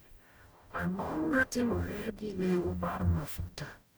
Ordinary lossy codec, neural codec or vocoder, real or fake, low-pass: none; codec, 44.1 kHz, 0.9 kbps, DAC; fake; none